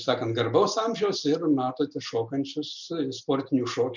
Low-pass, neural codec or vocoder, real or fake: 7.2 kHz; none; real